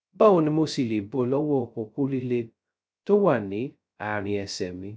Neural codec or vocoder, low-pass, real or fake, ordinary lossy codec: codec, 16 kHz, 0.2 kbps, FocalCodec; none; fake; none